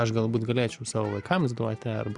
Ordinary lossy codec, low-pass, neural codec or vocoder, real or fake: Opus, 64 kbps; 10.8 kHz; none; real